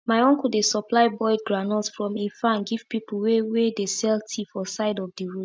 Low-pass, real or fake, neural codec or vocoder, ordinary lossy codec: none; real; none; none